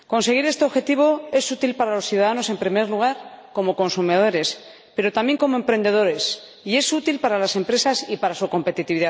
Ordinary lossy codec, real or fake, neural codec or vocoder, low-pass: none; real; none; none